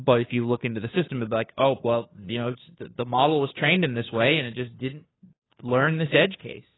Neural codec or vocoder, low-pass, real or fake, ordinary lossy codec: codec, 16 kHz, 2 kbps, FunCodec, trained on Chinese and English, 25 frames a second; 7.2 kHz; fake; AAC, 16 kbps